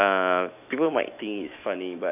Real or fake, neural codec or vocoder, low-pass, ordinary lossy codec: fake; autoencoder, 48 kHz, 128 numbers a frame, DAC-VAE, trained on Japanese speech; 3.6 kHz; none